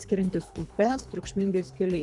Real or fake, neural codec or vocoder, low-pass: fake; codec, 24 kHz, 3 kbps, HILCodec; 10.8 kHz